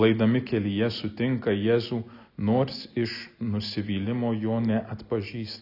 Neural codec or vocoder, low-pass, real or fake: none; 5.4 kHz; real